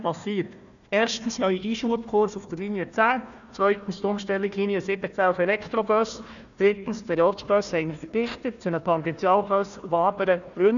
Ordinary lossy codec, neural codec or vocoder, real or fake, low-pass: none; codec, 16 kHz, 1 kbps, FunCodec, trained on Chinese and English, 50 frames a second; fake; 7.2 kHz